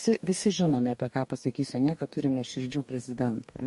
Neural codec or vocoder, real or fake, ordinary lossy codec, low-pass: codec, 44.1 kHz, 2.6 kbps, DAC; fake; MP3, 48 kbps; 14.4 kHz